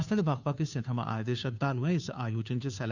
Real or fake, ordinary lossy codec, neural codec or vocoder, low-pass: fake; none; codec, 16 kHz, 2 kbps, FunCodec, trained on Chinese and English, 25 frames a second; 7.2 kHz